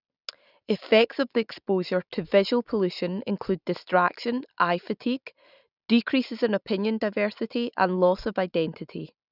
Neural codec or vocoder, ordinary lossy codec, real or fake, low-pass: none; none; real; 5.4 kHz